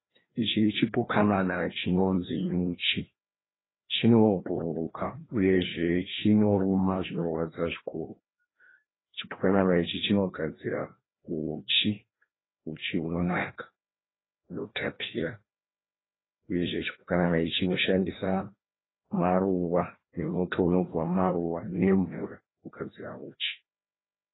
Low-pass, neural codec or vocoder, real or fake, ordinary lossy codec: 7.2 kHz; codec, 16 kHz, 1 kbps, FreqCodec, larger model; fake; AAC, 16 kbps